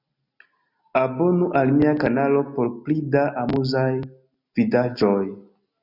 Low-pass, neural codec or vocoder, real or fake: 5.4 kHz; none; real